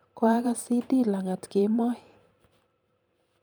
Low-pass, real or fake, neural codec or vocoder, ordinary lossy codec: none; fake; vocoder, 44.1 kHz, 128 mel bands every 512 samples, BigVGAN v2; none